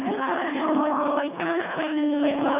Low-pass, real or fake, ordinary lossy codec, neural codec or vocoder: 3.6 kHz; fake; none; codec, 24 kHz, 1.5 kbps, HILCodec